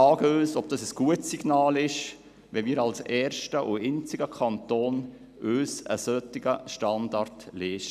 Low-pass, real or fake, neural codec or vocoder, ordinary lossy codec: 14.4 kHz; real; none; none